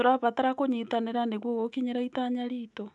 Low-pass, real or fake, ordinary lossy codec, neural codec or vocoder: 10.8 kHz; fake; none; autoencoder, 48 kHz, 128 numbers a frame, DAC-VAE, trained on Japanese speech